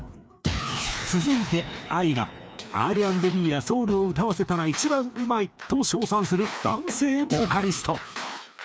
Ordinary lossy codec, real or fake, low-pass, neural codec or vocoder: none; fake; none; codec, 16 kHz, 2 kbps, FreqCodec, larger model